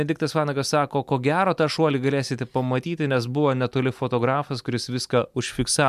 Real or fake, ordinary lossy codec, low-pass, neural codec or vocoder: real; MP3, 96 kbps; 14.4 kHz; none